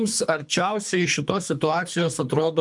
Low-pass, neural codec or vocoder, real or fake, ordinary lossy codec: 10.8 kHz; codec, 24 kHz, 3 kbps, HILCodec; fake; MP3, 96 kbps